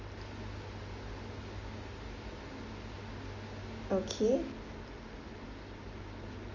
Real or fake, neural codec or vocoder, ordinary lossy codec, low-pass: real; none; Opus, 32 kbps; 7.2 kHz